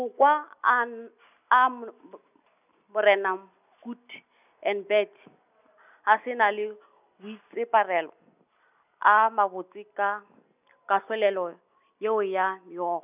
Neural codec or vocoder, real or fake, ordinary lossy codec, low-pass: none; real; none; 3.6 kHz